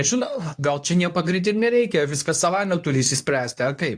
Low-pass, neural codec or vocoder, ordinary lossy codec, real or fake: 9.9 kHz; codec, 24 kHz, 0.9 kbps, WavTokenizer, medium speech release version 2; AAC, 64 kbps; fake